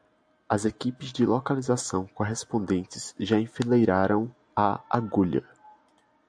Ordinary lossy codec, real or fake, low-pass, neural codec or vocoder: AAC, 64 kbps; real; 9.9 kHz; none